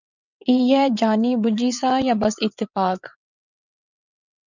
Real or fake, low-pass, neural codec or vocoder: fake; 7.2 kHz; vocoder, 22.05 kHz, 80 mel bands, WaveNeXt